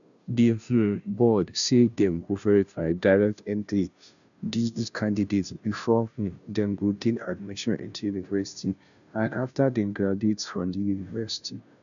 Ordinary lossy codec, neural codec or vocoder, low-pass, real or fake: none; codec, 16 kHz, 0.5 kbps, FunCodec, trained on Chinese and English, 25 frames a second; 7.2 kHz; fake